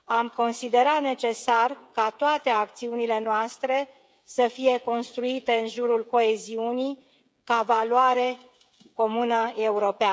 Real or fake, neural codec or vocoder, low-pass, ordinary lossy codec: fake; codec, 16 kHz, 16 kbps, FreqCodec, smaller model; none; none